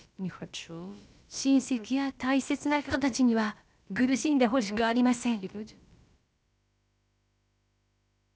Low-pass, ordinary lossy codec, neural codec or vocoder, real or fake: none; none; codec, 16 kHz, about 1 kbps, DyCAST, with the encoder's durations; fake